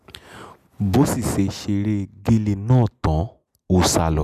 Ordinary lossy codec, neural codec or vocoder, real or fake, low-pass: none; none; real; 14.4 kHz